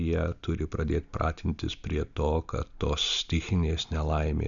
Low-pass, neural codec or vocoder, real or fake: 7.2 kHz; none; real